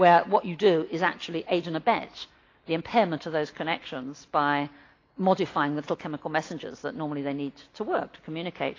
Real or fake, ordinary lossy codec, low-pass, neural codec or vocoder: real; AAC, 32 kbps; 7.2 kHz; none